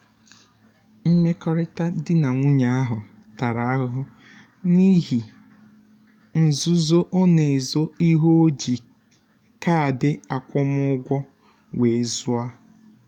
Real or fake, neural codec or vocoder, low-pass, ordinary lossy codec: fake; codec, 44.1 kHz, 7.8 kbps, DAC; 19.8 kHz; none